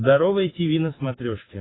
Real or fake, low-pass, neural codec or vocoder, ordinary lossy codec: real; 7.2 kHz; none; AAC, 16 kbps